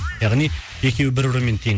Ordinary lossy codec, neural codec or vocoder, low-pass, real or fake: none; none; none; real